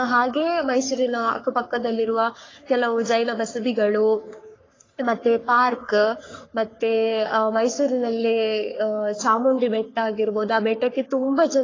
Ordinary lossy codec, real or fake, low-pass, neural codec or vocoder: AAC, 32 kbps; fake; 7.2 kHz; codec, 44.1 kHz, 3.4 kbps, Pupu-Codec